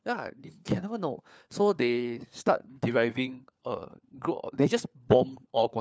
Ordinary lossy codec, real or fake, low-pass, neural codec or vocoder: none; fake; none; codec, 16 kHz, 4 kbps, FunCodec, trained on LibriTTS, 50 frames a second